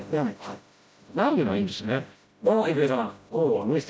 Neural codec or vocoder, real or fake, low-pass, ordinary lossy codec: codec, 16 kHz, 0.5 kbps, FreqCodec, smaller model; fake; none; none